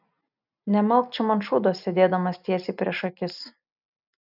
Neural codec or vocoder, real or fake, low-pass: none; real; 5.4 kHz